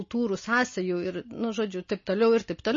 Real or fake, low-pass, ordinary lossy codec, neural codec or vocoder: real; 7.2 kHz; MP3, 32 kbps; none